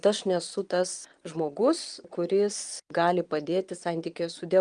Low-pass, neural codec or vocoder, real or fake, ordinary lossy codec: 9.9 kHz; vocoder, 22.05 kHz, 80 mel bands, Vocos; fake; Opus, 32 kbps